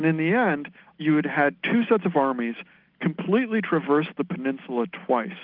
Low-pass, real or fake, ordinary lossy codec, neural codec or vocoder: 5.4 kHz; real; Opus, 24 kbps; none